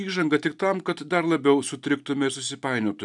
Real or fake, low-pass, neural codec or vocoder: real; 10.8 kHz; none